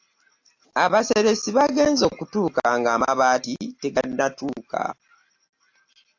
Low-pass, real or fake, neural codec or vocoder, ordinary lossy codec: 7.2 kHz; real; none; AAC, 48 kbps